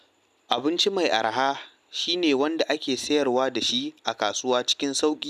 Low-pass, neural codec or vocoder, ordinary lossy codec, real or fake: 14.4 kHz; none; none; real